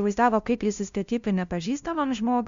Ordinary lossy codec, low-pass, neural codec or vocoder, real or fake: MP3, 64 kbps; 7.2 kHz; codec, 16 kHz, 0.5 kbps, FunCodec, trained on LibriTTS, 25 frames a second; fake